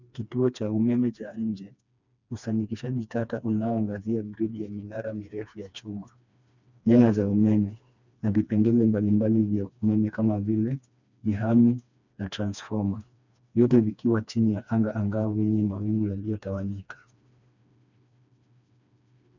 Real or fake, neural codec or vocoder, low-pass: fake; codec, 16 kHz, 2 kbps, FreqCodec, smaller model; 7.2 kHz